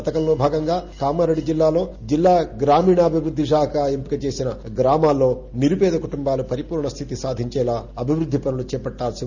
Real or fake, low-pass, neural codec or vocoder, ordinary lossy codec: real; 7.2 kHz; none; none